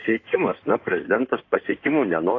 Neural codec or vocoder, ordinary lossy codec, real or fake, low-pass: codec, 16 kHz in and 24 kHz out, 2.2 kbps, FireRedTTS-2 codec; AAC, 32 kbps; fake; 7.2 kHz